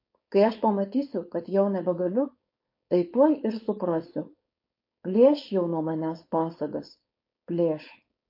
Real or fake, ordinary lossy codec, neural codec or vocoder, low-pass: fake; MP3, 32 kbps; codec, 16 kHz, 4.8 kbps, FACodec; 5.4 kHz